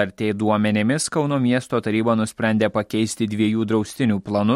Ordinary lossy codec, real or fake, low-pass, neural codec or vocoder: MP3, 64 kbps; real; 19.8 kHz; none